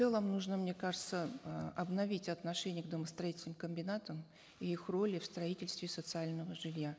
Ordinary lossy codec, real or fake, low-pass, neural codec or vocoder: none; real; none; none